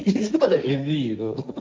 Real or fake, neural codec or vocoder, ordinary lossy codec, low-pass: fake; codec, 16 kHz, 1.1 kbps, Voila-Tokenizer; none; none